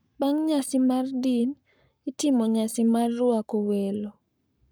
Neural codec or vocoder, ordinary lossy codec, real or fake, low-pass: codec, 44.1 kHz, 7.8 kbps, Pupu-Codec; none; fake; none